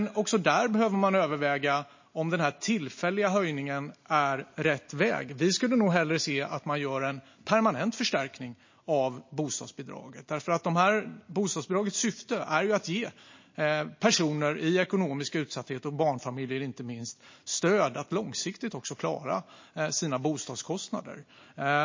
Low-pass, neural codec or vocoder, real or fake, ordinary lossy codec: 7.2 kHz; none; real; MP3, 32 kbps